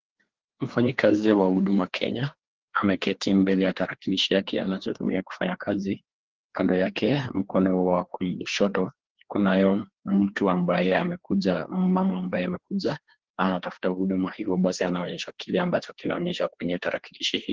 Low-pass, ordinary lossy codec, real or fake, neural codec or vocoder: 7.2 kHz; Opus, 16 kbps; fake; codec, 16 kHz, 2 kbps, FreqCodec, larger model